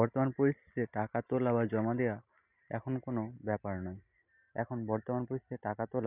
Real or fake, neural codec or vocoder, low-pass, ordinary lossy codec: real; none; 3.6 kHz; none